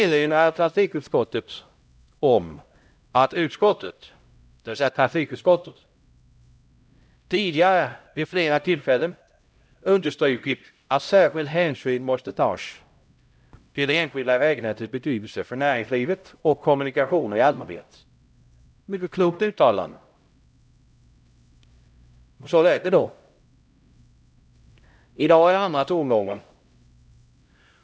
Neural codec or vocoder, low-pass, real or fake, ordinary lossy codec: codec, 16 kHz, 0.5 kbps, X-Codec, HuBERT features, trained on LibriSpeech; none; fake; none